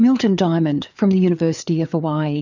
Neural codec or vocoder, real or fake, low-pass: codec, 16 kHz, 4 kbps, FreqCodec, larger model; fake; 7.2 kHz